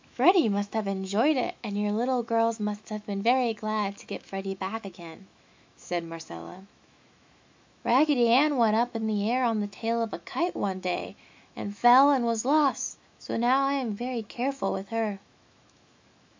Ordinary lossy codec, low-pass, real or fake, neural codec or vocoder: MP3, 64 kbps; 7.2 kHz; fake; autoencoder, 48 kHz, 128 numbers a frame, DAC-VAE, trained on Japanese speech